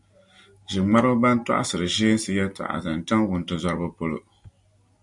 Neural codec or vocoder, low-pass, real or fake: none; 10.8 kHz; real